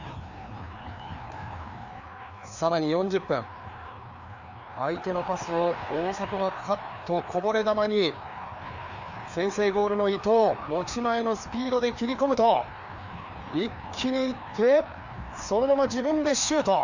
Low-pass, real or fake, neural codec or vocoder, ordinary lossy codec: 7.2 kHz; fake; codec, 16 kHz, 2 kbps, FreqCodec, larger model; none